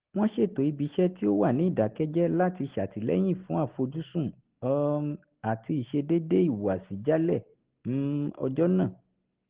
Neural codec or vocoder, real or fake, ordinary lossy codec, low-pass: none; real; Opus, 16 kbps; 3.6 kHz